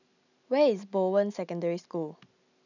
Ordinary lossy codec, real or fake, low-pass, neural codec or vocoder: none; real; 7.2 kHz; none